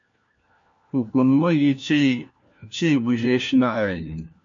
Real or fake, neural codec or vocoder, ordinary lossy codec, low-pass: fake; codec, 16 kHz, 1 kbps, FunCodec, trained on LibriTTS, 50 frames a second; MP3, 48 kbps; 7.2 kHz